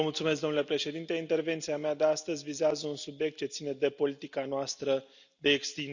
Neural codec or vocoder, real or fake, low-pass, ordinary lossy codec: none; real; 7.2 kHz; none